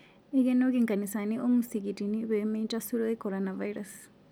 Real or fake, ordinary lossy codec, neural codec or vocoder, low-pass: real; none; none; none